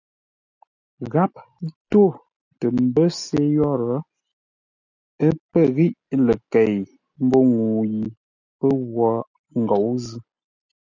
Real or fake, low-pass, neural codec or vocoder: real; 7.2 kHz; none